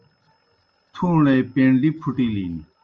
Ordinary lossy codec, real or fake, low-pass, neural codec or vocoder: Opus, 24 kbps; real; 7.2 kHz; none